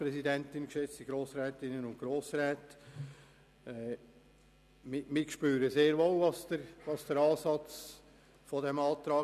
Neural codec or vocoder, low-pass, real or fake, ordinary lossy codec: none; 14.4 kHz; real; none